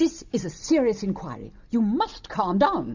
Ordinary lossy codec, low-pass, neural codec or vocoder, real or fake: Opus, 64 kbps; 7.2 kHz; none; real